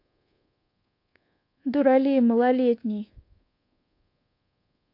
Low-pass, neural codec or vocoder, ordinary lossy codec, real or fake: 5.4 kHz; codec, 24 kHz, 1.2 kbps, DualCodec; AAC, 32 kbps; fake